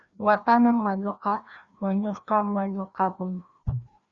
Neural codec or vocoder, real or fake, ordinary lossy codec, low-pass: codec, 16 kHz, 1 kbps, FreqCodec, larger model; fake; Opus, 64 kbps; 7.2 kHz